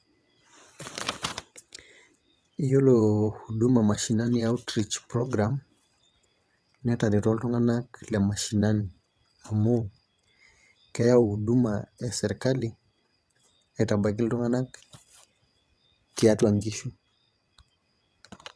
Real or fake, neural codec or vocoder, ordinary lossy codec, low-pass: fake; vocoder, 22.05 kHz, 80 mel bands, WaveNeXt; none; none